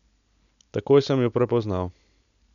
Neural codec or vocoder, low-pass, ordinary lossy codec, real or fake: none; 7.2 kHz; none; real